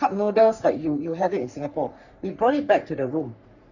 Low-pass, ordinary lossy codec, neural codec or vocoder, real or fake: 7.2 kHz; none; codec, 44.1 kHz, 3.4 kbps, Pupu-Codec; fake